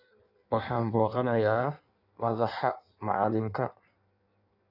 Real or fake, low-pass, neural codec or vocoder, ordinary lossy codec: fake; 5.4 kHz; codec, 16 kHz in and 24 kHz out, 1.1 kbps, FireRedTTS-2 codec; AAC, 48 kbps